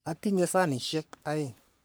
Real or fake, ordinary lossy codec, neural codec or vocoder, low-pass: fake; none; codec, 44.1 kHz, 3.4 kbps, Pupu-Codec; none